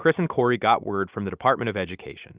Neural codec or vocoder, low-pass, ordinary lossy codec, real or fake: none; 3.6 kHz; Opus, 64 kbps; real